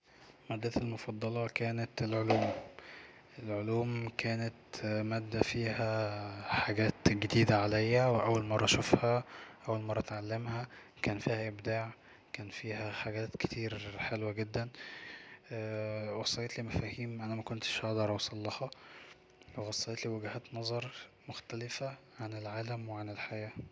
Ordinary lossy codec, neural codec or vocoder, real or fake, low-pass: none; none; real; none